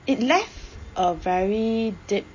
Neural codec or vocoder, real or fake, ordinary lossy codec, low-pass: none; real; MP3, 32 kbps; 7.2 kHz